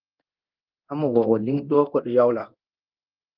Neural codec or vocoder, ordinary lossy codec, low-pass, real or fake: codec, 24 kHz, 0.9 kbps, DualCodec; Opus, 24 kbps; 5.4 kHz; fake